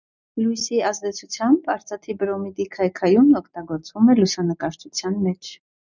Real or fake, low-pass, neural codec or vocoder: real; 7.2 kHz; none